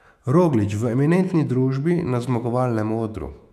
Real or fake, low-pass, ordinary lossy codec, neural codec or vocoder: fake; 14.4 kHz; none; autoencoder, 48 kHz, 128 numbers a frame, DAC-VAE, trained on Japanese speech